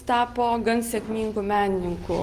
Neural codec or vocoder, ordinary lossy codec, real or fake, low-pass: none; Opus, 32 kbps; real; 14.4 kHz